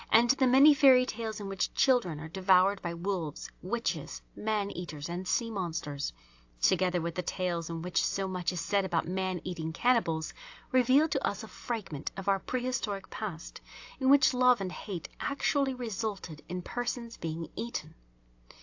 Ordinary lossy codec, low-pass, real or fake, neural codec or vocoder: AAC, 48 kbps; 7.2 kHz; real; none